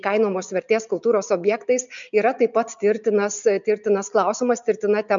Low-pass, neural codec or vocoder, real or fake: 7.2 kHz; none; real